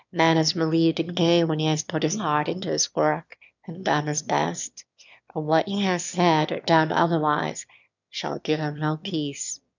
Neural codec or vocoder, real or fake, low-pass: autoencoder, 22.05 kHz, a latent of 192 numbers a frame, VITS, trained on one speaker; fake; 7.2 kHz